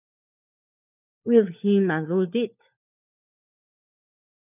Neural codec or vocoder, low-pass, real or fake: codec, 16 kHz, 4 kbps, FunCodec, trained on LibriTTS, 50 frames a second; 3.6 kHz; fake